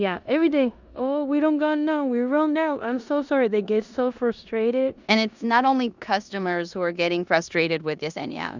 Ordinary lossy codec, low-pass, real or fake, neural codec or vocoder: Opus, 64 kbps; 7.2 kHz; fake; codec, 16 kHz in and 24 kHz out, 0.9 kbps, LongCat-Audio-Codec, four codebook decoder